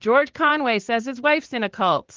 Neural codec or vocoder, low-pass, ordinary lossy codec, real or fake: vocoder, 44.1 kHz, 80 mel bands, Vocos; 7.2 kHz; Opus, 16 kbps; fake